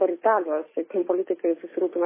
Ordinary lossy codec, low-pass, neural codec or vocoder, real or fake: MP3, 16 kbps; 3.6 kHz; none; real